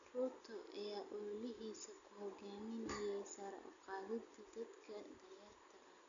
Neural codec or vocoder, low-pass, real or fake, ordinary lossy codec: none; 7.2 kHz; real; none